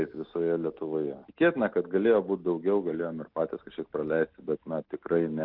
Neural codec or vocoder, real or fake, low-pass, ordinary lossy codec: none; real; 5.4 kHz; Opus, 32 kbps